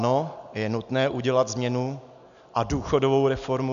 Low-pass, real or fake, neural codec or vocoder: 7.2 kHz; real; none